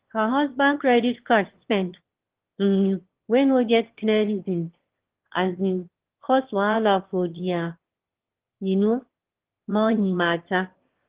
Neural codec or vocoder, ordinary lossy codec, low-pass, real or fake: autoencoder, 22.05 kHz, a latent of 192 numbers a frame, VITS, trained on one speaker; Opus, 16 kbps; 3.6 kHz; fake